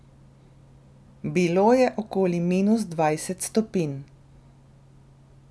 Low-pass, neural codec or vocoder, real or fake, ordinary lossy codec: none; none; real; none